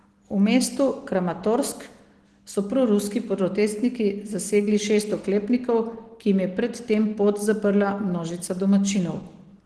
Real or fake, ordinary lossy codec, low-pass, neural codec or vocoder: real; Opus, 16 kbps; 10.8 kHz; none